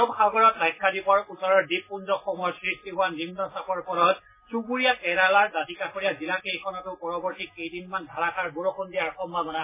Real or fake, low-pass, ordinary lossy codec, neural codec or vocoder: real; 3.6 kHz; MP3, 16 kbps; none